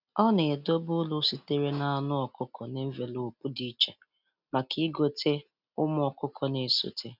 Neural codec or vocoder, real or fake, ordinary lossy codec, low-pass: none; real; none; 5.4 kHz